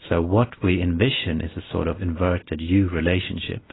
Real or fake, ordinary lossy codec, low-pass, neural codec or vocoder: real; AAC, 16 kbps; 7.2 kHz; none